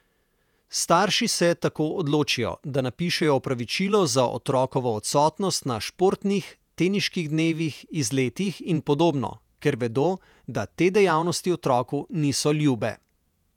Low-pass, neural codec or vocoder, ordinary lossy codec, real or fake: 19.8 kHz; vocoder, 48 kHz, 128 mel bands, Vocos; none; fake